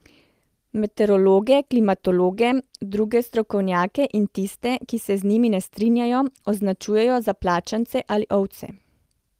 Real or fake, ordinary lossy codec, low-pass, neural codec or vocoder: real; Opus, 24 kbps; 14.4 kHz; none